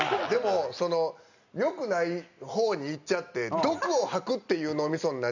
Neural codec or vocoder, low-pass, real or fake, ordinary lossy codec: none; 7.2 kHz; real; none